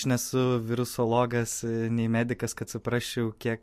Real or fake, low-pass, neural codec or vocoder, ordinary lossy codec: fake; 14.4 kHz; vocoder, 44.1 kHz, 128 mel bands every 512 samples, BigVGAN v2; MP3, 64 kbps